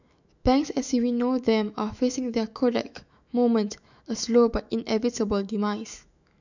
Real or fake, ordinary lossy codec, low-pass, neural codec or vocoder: fake; none; 7.2 kHz; autoencoder, 48 kHz, 128 numbers a frame, DAC-VAE, trained on Japanese speech